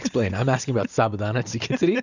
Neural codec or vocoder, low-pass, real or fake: none; 7.2 kHz; real